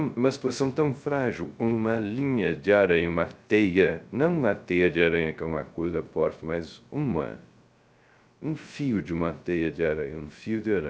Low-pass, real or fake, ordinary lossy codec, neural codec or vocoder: none; fake; none; codec, 16 kHz, 0.3 kbps, FocalCodec